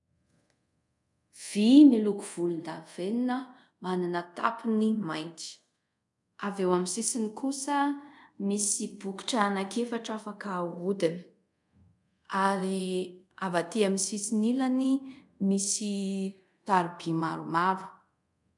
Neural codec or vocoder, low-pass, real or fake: codec, 24 kHz, 0.5 kbps, DualCodec; 10.8 kHz; fake